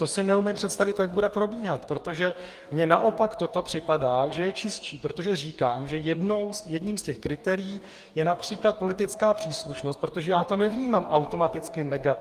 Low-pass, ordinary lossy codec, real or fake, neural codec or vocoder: 14.4 kHz; Opus, 32 kbps; fake; codec, 44.1 kHz, 2.6 kbps, DAC